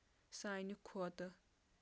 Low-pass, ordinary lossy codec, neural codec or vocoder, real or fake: none; none; none; real